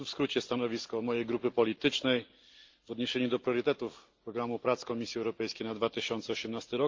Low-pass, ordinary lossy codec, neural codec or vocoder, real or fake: 7.2 kHz; Opus, 24 kbps; none; real